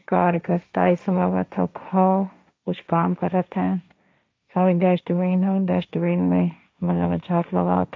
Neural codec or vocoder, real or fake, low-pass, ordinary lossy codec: codec, 16 kHz, 1.1 kbps, Voila-Tokenizer; fake; none; none